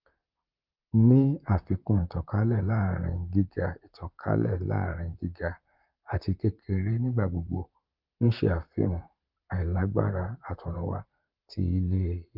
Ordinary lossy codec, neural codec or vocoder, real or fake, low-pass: Opus, 24 kbps; vocoder, 44.1 kHz, 128 mel bands, Pupu-Vocoder; fake; 5.4 kHz